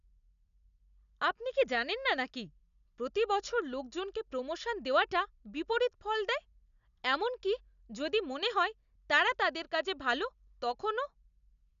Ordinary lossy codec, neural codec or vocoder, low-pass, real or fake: none; none; 7.2 kHz; real